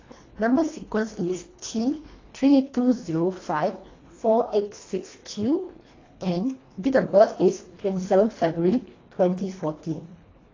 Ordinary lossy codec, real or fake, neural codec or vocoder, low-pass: AAC, 32 kbps; fake; codec, 24 kHz, 1.5 kbps, HILCodec; 7.2 kHz